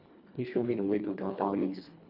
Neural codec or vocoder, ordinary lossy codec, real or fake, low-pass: codec, 24 kHz, 1.5 kbps, HILCodec; none; fake; 5.4 kHz